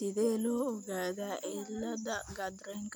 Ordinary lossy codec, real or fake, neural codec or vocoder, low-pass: none; real; none; none